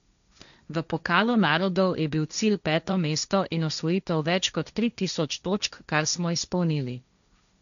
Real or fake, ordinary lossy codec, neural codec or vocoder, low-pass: fake; none; codec, 16 kHz, 1.1 kbps, Voila-Tokenizer; 7.2 kHz